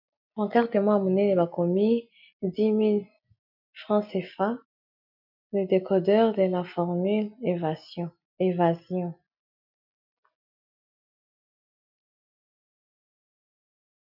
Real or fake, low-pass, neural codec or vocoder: real; 5.4 kHz; none